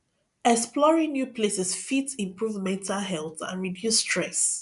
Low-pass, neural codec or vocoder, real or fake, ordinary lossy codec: 10.8 kHz; none; real; none